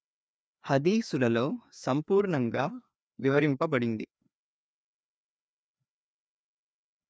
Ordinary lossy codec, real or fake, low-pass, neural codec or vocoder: none; fake; none; codec, 16 kHz, 2 kbps, FreqCodec, larger model